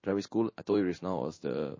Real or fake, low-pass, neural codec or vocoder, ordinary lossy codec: fake; 7.2 kHz; codec, 16 kHz in and 24 kHz out, 1 kbps, XY-Tokenizer; MP3, 32 kbps